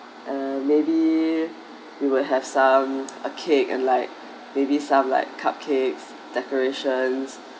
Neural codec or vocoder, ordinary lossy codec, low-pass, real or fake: none; none; none; real